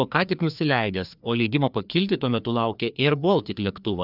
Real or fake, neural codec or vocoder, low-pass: fake; codec, 16 kHz, 2 kbps, FreqCodec, larger model; 5.4 kHz